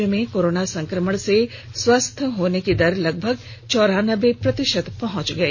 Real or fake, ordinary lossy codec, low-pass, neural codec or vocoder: real; none; 7.2 kHz; none